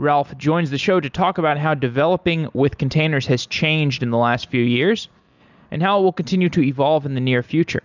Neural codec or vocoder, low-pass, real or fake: none; 7.2 kHz; real